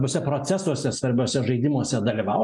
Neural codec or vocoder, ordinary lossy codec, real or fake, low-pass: none; MP3, 96 kbps; real; 10.8 kHz